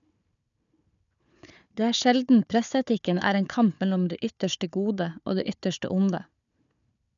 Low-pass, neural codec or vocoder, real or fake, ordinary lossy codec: 7.2 kHz; codec, 16 kHz, 4 kbps, FunCodec, trained on Chinese and English, 50 frames a second; fake; none